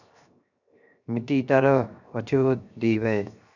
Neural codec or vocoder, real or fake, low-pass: codec, 16 kHz, 0.7 kbps, FocalCodec; fake; 7.2 kHz